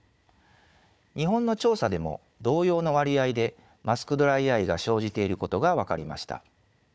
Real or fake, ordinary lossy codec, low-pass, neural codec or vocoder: fake; none; none; codec, 16 kHz, 4 kbps, FunCodec, trained on Chinese and English, 50 frames a second